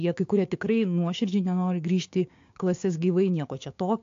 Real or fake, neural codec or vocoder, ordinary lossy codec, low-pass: fake; codec, 16 kHz, 6 kbps, DAC; AAC, 48 kbps; 7.2 kHz